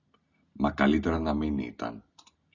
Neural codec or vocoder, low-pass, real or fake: none; 7.2 kHz; real